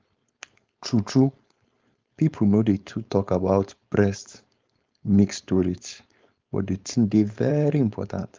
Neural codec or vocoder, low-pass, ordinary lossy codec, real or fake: codec, 16 kHz, 4.8 kbps, FACodec; 7.2 kHz; Opus, 32 kbps; fake